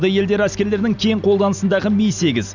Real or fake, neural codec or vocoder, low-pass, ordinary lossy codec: real; none; 7.2 kHz; none